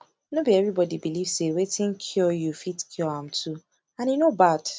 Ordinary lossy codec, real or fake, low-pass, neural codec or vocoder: none; real; none; none